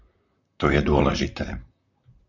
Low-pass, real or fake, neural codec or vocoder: 7.2 kHz; fake; vocoder, 44.1 kHz, 128 mel bands, Pupu-Vocoder